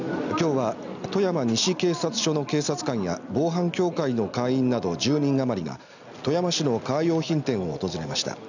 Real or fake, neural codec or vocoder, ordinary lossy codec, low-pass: real; none; none; 7.2 kHz